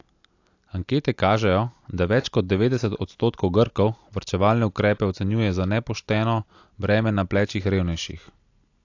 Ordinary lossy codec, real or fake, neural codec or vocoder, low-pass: AAC, 48 kbps; real; none; 7.2 kHz